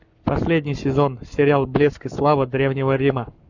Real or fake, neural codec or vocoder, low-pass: fake; codec, 44.1 kHz, 7.8 kbps, Pupu-Codec; 7.2 kHz